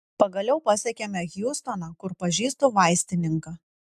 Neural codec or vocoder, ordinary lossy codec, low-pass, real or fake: none; AAC, 96 kbps; 14.4 kHz; real